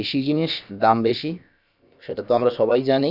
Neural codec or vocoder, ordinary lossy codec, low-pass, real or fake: codec, 16 kHz, about 1 kbps, DyCAST, with the encoder's durations; none; 5.4 kHz; fake